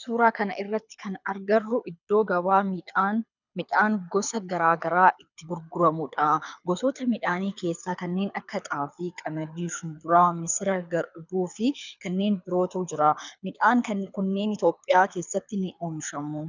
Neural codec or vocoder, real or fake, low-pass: codec, 24 kHz, 6 kbps, HILCodec; fake; 7.2 kHz